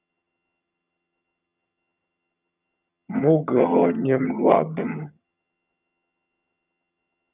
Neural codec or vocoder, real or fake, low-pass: vocoder, 22.05 kHz, 80 mel bands, HiFi-GAN; fake; 3.6 kHz